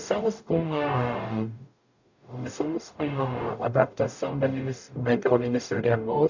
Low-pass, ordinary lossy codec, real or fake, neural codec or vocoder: 7.2 kHz; none; fake; codec, 44.1 kHz, 0.9 kbps, DAC